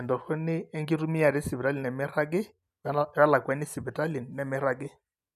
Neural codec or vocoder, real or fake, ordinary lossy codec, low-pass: none; real; none; 14.4 kHz